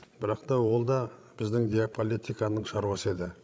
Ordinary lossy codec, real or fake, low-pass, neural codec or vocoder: none; fake; none; codec, 16 kHz, 16 kbps, FreqCodec, larger model